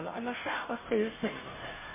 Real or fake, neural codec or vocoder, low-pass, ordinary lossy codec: fake; codec, 16 kHz, 0.5 kbps, FunCodec, trained on Chinese and English, 25 frames a second; 3.6 kHz; MP3, 16 kbps